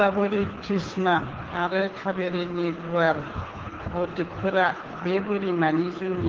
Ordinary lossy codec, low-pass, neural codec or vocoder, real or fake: Opus, 16 kbps; 7.2 kHz; codec, 24 kHz, 3 kbps, HILCodec; fake